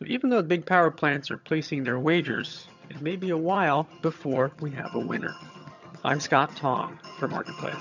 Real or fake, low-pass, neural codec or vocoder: fake; 7.2 kHz; vocoder, 22.05 kHz, 80 mel bands, HiFi-GAN